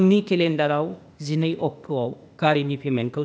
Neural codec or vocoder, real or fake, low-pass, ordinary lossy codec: codec, 16 kHz, 0.8 kbps, ZipCodec; fake; none; none